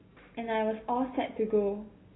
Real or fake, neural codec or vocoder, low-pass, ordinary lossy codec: real; none; 7.2 kHz; AAC, 16 kbps